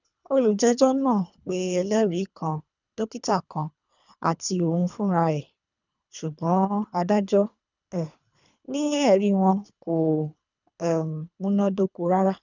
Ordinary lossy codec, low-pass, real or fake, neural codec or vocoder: none; 7.2 kHz; fake; codec, 24 kHz, 3 kbps, HILCodec